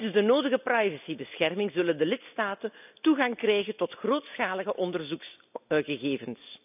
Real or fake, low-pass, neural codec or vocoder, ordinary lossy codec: real; 3.6 kHz; none; none